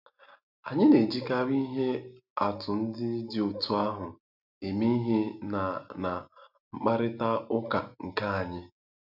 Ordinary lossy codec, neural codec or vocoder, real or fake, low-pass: none; none; real; 5.4 kHz